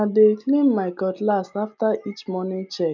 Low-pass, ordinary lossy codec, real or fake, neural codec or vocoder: 7.2 kHz; none; real; none